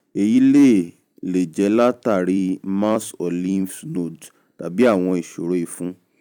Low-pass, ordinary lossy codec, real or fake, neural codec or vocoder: 19.8 kHz; none; fake; vocoder, 44.1 kHz, 128 mel bands every 256 samples, BigVGAN v2